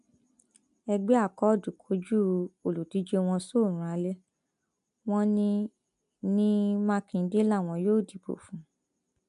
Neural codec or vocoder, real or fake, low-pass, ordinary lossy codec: none; real; 10.8 kHz; AAC, 96 kbps